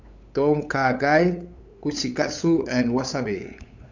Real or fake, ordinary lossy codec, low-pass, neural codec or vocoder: fake; none; 7.2 kHz; codec, 16 kHz, 8 kbps, FunCodec, trained on LibriTTS, 25 frames a second